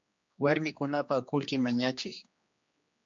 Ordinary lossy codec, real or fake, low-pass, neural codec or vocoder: MP3, 48 kbps; fake; 7.2 kHz; codec, 16 kHz, 2 kbps, X-Codec, HuBERT features, trained on general audio